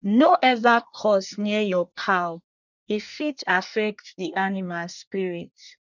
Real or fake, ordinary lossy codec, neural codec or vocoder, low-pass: fake; none; codec, 24 kHz, 1 kbps, SNAC; 7.2 kHz